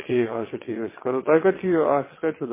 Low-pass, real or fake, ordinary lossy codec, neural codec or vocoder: 3.6 kHz; fake; MP3, 16 kbps; vocoder, 22.05 kHz, 80 mel bands, WaveNeXt